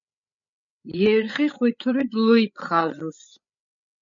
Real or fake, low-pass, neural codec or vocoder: fake; 7.2 kHz; codec, 16 kHz, 8 kbps, FreqCodec, larger model